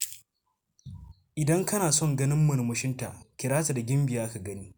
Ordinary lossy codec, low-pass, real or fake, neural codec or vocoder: none; none; real; none